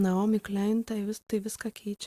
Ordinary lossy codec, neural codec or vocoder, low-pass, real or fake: Opus, 64 kbps; none; 14.4 kHz; real